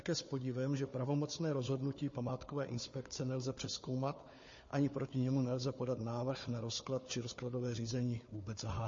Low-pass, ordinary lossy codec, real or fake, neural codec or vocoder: 7.2 kHz; MP3, 32 kbps; fake; codec, 16 kHz, 4 kbps, FunCodec, trained on Chinese and English, 50 frames a second